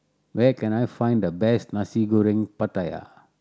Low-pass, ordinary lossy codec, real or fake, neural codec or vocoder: none; none; real; none